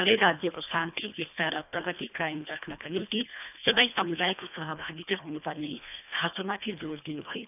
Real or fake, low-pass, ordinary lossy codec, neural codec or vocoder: fake; 3.6 kHz; none; codec, 24 kHz, 1.5 kbps, HILCodec